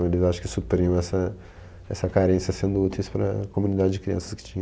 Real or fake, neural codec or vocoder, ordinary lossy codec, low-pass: real; none; none; none